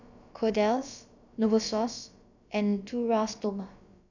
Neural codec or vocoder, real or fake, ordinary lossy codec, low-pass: codec, 16 kHz, about 1 kbps, DyCAST, with the encoder's durations; fake; none; 7.2 kHz